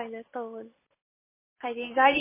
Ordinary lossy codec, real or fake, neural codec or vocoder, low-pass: MP3, 16 kbps; real; none; 3.6 kHz